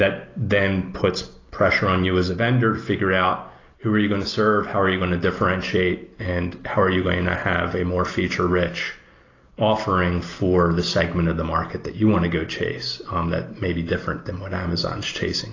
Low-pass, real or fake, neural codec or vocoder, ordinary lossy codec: 7.2 kHz; real; none; AAC, 32 kbps